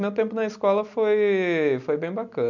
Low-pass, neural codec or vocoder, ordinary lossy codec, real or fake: 7.2 kHz; none; none; real